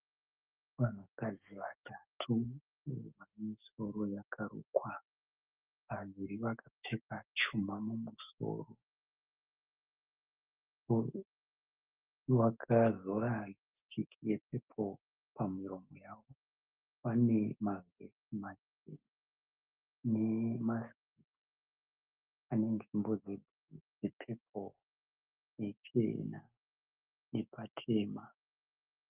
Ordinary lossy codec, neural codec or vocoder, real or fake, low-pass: Opus, 24 kbps; autoencoder, 48 kHz, 128 numbers a frame, DAC-VAE, trained on Japanese speech; fake; 3.6 kHz